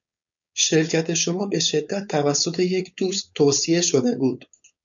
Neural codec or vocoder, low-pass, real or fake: codec, 16 kHz, 4.8 kbps, FACodec; 7.2 kHz; fake